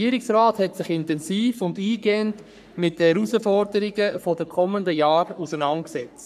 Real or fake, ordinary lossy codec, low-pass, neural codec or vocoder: fake; none; 14.4 kHz; codec, 44.1 kHz, 3.4 kbps, Pupu-Codec